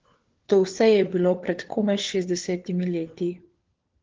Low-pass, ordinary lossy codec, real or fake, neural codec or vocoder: 7.2 kHz; Opus, 16 kbps; fake; codec, 16 kHz, 4 kbps, FunCodec, trained on LibriTTS, 50 frames a second